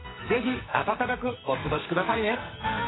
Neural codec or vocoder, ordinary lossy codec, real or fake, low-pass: codec, 44.1 kHz, 2.6 kbps, SNAC; AAC, 16 kbps; fake; 7.2 kHz